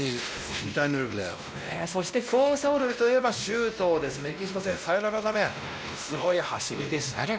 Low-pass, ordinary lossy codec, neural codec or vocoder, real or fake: none; none; codec, 16 kHz, 1 kbps, X-Codec, WavLM features, trained on Multilingual LibriSpeech; fake